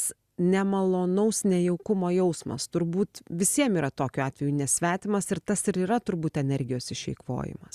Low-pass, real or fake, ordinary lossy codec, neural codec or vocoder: 14.4 kHz; real; Opus, 64 kbps; none